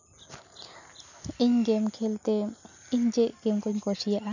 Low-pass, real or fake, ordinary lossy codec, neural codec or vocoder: 7.2 kHz; real; none; none